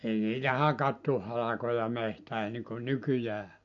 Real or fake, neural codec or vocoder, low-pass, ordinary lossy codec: real; none; 7.2 kHz; MP3, 48 kbps